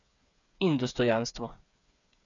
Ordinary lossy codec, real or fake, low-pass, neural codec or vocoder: none; fake; 7.2 kHz; codec, 16 kHz, 8 kbps, FreqCodec, smaller model